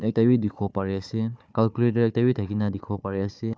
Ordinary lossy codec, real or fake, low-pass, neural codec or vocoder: none; fake; none; codec, 16 kHz, 4 kbps, FunCodec, trained on Chinese and English, 50 frames a second